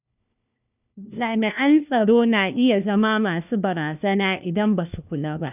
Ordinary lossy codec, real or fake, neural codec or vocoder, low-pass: none; fake; codec, 16 kHz, 1 kbps, FunCodec, trained on LibriTTS, 50 frames a second; 3.6 kHz